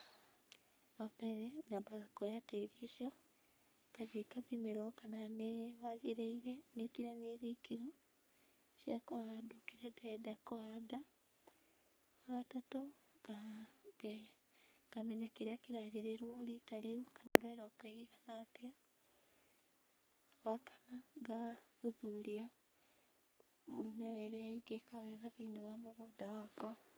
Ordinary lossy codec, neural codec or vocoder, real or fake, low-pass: none; codec, 44.1 kHz, 3.4 kbps, Pupu-Codec; fake; none